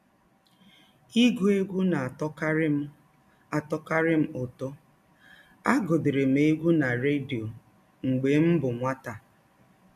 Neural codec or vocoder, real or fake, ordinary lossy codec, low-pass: none; real; none; 14.4 kHz